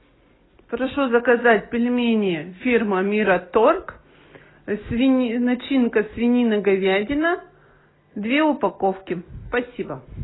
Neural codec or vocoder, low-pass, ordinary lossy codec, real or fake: none; 7.2 kHz; AAC, 16 kbps; real